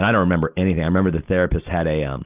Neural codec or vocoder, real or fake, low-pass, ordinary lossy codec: none; real; 3.6 kHz; Opus, 32 kbps